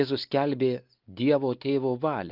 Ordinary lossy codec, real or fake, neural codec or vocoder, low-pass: Opus, 32 kbps; real; none; 5.4 kHz